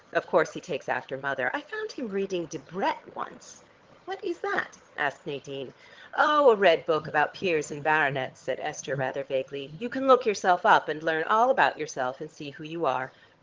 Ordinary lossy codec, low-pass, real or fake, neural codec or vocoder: Opus, 16 kbps; 7.2 kHz; fake; vocoder, 22.05 kHz, 80 mel bands, HiFi-GAN